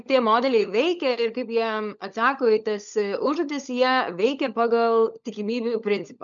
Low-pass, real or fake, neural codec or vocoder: 7.2 kHz; fake; codec, 16 kHz, 16 kbps, FunCodec, trained on LibriTTS, 50 frames a second